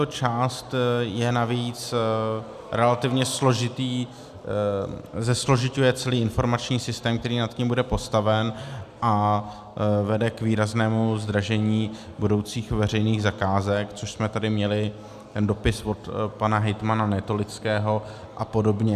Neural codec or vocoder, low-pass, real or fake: none; 14.4 kHz; real